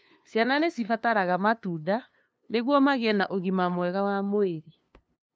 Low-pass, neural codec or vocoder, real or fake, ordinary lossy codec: none; codec, 16 kHz, 2 kbps, FunCodec, trained on LibriTTS, 25 frames a second; fake; none